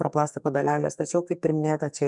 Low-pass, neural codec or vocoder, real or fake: 10.8 kHz; codec, 44.1 kHz, 2.6 kbps, SNAC; fake